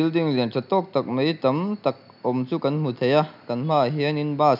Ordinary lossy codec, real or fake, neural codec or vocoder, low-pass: none; real; none; 5.4 kHz